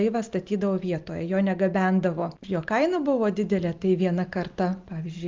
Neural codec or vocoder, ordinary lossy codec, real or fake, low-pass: none; Opus, 24 kbps; real; 7.2 kHz